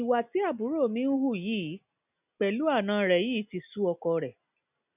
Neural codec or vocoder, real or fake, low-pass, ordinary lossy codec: none; real; 3.6 kHz; none